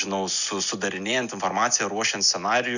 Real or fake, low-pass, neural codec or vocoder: real; 7.2 kHz; none